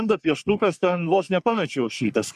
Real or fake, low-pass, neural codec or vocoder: fake; 14.4 kHz; codec, 44.1 kHz, 3.4 kbps, Pupu-Codec